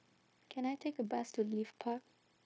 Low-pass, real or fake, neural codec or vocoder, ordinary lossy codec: none; fake; codec, 16 kHz, 0.9 kbps, LongCat-Audio-Codec; none